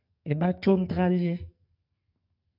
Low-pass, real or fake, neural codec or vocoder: 5.4 kHz; fake; codec, 44.1 kHz, 2.6 kbps, SNAC